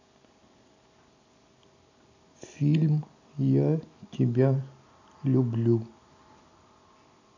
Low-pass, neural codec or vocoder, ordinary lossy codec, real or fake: 7.2 kHz; none; AAC, 48 kbps; real